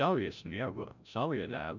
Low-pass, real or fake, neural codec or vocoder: 7.2 kHz; fake; codec, 16 kHz, 0.5 kbps, FreqCodec, larger model